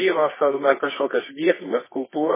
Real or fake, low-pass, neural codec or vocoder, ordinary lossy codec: fake; 3.6 kHz; codec, 24 kHz, 0.9 kbps, WavTokenizer, medium music audio release; MP3, 16 kbps